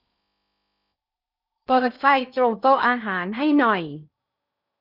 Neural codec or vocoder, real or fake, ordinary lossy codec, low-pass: codec, 16 kHz in and 24 kHz out, 0.6 kbps, FocalCodec, streaming, 4096 codes; fake; none; 5.4 kHz